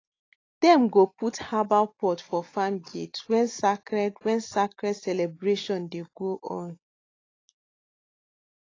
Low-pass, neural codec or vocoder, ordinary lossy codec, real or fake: 7.2 kHz; none; AAC, 32 kbps; real